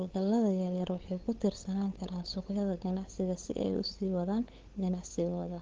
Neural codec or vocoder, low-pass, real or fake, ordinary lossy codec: codec, 16 kHz, 8 kbps, FunCodec, trained on LibriTTS, 25 frames a second; 7.2 kHz; fake; Opus, 16 kbps